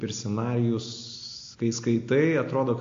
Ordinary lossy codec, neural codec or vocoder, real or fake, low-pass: AAC, 48 kbps; none; real; 7.2 kHz